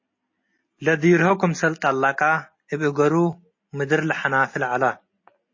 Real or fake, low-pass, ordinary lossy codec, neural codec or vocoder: real; 7.2 kHz; MP3, 32 kbps; none